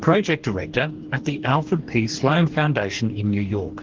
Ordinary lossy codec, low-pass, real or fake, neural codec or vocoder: Opus, 16 kbps; 7.2 kHz; fake; codec, 44.1 kHz, 2.6 kbps, DAC